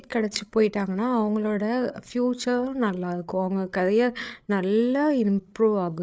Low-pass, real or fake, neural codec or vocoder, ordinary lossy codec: none; fake; codec, 16 kHz, 8 kbps, FreqCodec, larger model; none